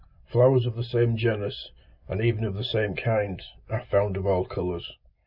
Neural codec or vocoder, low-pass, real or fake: none; 5.4 kHz; real